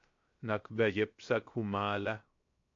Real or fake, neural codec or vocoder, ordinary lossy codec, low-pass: fake; codec, 16 kHz, 0.7 kbps, FocalCodec; MP3, 48 kbps; 7.2 kHz